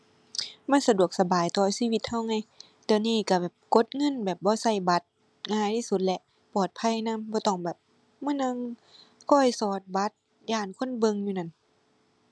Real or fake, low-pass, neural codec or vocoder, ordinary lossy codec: real; none; none; none